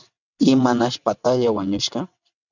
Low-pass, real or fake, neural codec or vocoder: 7.2 kHz; fake; vocoder, 22.05 kHz, 80 mel bands, WaveNeXt